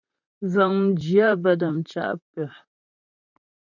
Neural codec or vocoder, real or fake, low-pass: vocoder, 44.1 kHz, 128 mel bands, Pupu-Vocoder; fake; 7.2 kHz